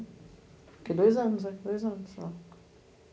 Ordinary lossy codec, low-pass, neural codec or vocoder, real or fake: none; none; none; real